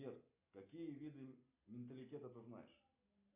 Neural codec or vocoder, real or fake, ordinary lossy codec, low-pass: none; real; AAC, 32 kbps; 3.6 kHz